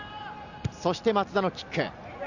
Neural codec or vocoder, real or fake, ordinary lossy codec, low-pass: none; real; none; 7.2 kHz